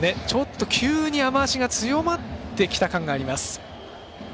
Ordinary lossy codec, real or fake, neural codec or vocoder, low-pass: none; real; none; none